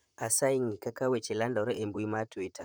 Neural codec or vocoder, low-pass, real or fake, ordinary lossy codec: vocoder, 44.1 kHz, 128 mel bands, Pupu-Vocoder; none; fake; none